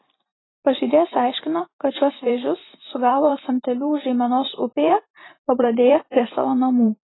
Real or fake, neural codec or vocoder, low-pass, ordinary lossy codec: fake; vocoder, 44.1 kHz, 128 mel bands every 512 samples, BigVGAN v2; 7.2 kHz; AAC, 16 kbps